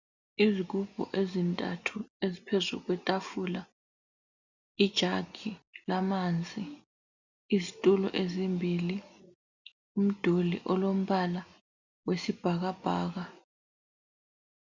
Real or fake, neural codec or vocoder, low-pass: real; none; 7.2 kHz